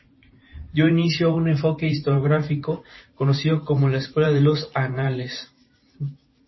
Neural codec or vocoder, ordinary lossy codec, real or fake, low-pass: vocoder, 44.1 kHz, 128 mel bands every 512 samples, BigVGAN v2; MP3, 24 kbps; fake; 7.2 kHz